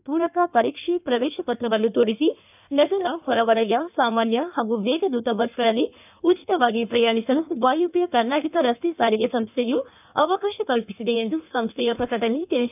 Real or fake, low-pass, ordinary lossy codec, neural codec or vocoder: fake; 3.6 kHz; none; codec, 16 kHz in and 24 kHz out, 1.1 kbps, FireRedTTS-2 codec